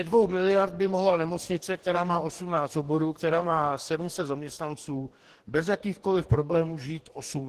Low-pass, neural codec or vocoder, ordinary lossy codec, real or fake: 14.4 kHz; codec, 44.1 kHz, 2.6 kbps, DAC; Opus, 16 kbps; fake